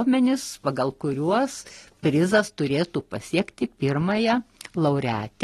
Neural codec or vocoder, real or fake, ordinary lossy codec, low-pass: none; real; AAC, 32 kbps; 19.8 kHz